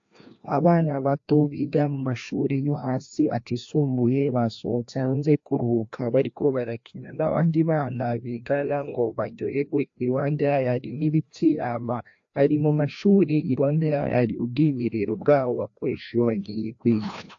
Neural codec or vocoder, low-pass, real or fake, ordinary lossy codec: codec, 16 kHz, 1 kbps, FreqCodec, larger model; 7.2 kHz; fake; AAC, 48 kbps